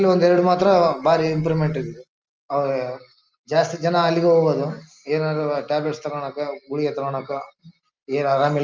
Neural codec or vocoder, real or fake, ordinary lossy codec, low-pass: none; real; Opus, 24 kbps; 7.2 kHz